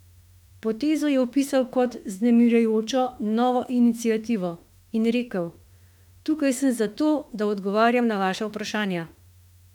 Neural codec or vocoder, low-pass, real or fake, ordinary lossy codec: autoencoder, 48 kHz, 32 numbers a frame, DAC-VAE, trained on Japanese speech; 19.8 kHz; fake; none